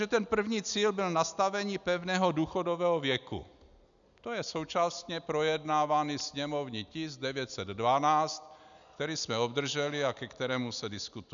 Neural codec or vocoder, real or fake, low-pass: none; real; 7.2 kHz